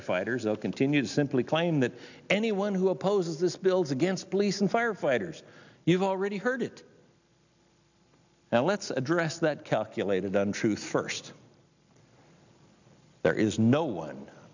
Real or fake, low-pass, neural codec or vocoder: real; 7.2 kHz; none